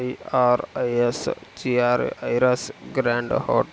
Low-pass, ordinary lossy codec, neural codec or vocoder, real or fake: none; none; none; real